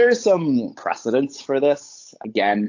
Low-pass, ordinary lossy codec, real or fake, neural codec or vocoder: 7.2 kHz; AAC, 48 kbps; fake; codec, 16 kHz, 8 kbps, FunCodec, trained on Chinese and English, 25 frames a second